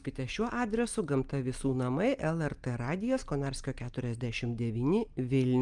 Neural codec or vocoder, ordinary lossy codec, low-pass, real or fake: none; Opus, 32 kbps; 10.8 kHz; real